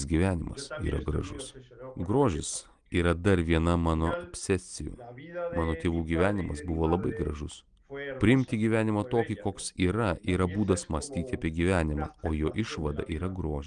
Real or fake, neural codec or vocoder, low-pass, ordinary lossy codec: real; none; 9.9 kHz; Opus, 32 kbps